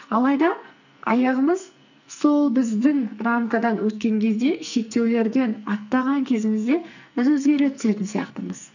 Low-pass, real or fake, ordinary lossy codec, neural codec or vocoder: 7.2 kHz; fake; none; codec, 44.1 kHz, 2.6 kbps, SNAC